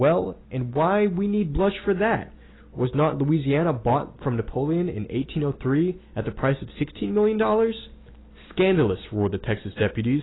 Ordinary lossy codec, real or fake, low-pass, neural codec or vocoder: AAC, 16 kbps; real; 7.2 kHz; none